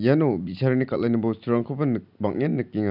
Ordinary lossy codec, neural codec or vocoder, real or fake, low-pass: none; none; real; 5.4 kHz